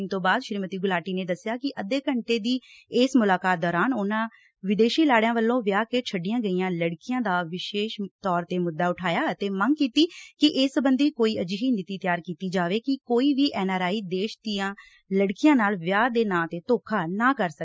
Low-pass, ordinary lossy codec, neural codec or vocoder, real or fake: none; none; none; real